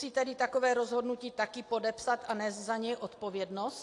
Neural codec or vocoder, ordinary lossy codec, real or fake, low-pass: none; AAC, 48 kbps; real; 10.8 kHz